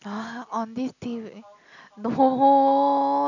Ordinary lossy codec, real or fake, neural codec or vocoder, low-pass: none; real; none; 7.2 kHz